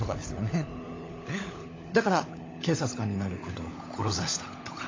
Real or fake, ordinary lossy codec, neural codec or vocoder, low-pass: fake; AAC, 32 kbps; codec, 16 kHz, 16 kbps, FunCodec, trained on LibriTTS, 50 frames a second; 7.2 kHz